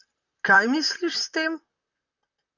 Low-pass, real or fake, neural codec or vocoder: 7.2 kHz; fake; vocoder, 44.1 kHz, 128 mel bands, Pupu-Vocoder